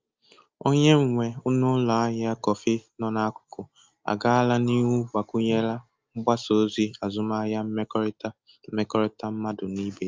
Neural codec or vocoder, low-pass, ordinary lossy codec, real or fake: none; 7.2 kHz; Opus, 24 kbps; real